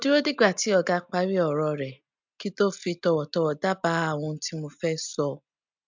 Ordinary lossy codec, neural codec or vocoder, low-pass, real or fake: MP3, 64 kbps; none; 7.2 kHz; real